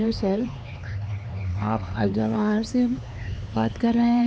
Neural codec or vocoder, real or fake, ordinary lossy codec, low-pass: codec, 16 kHz, 4 kbps, X-Codec, HuBERT features, trained on LibriSpeech; fake; none; none